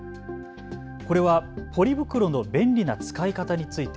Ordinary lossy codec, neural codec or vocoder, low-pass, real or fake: none; none; none; real